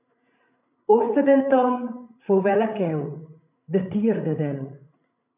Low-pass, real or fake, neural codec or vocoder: 3.6 kHz; fake; codec, 16 kHz, 8 kbps, FreqCodec, larger model